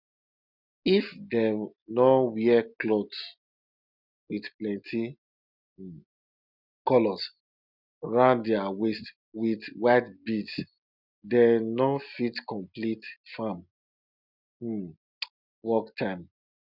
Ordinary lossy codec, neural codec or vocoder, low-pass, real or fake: none; none; 5.4 kHz; real